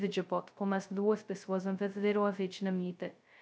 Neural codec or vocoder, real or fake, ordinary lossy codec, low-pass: codec, 16 kHz, 0.2 kbps, FocalCodec; fake; none; none